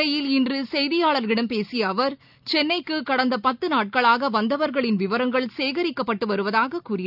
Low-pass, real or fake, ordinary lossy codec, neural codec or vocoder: 5.4 kHz; real; none; none